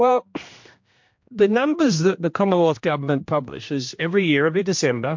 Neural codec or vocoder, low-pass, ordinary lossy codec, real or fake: codec, 16 kHz, 1 kbps, X-Codec, HuBERT features, trained on general audio; 7.2 kHz; MP3, 48 kbps; fake